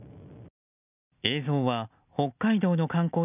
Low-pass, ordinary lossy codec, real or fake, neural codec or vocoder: 3.6 kHz; none; real; none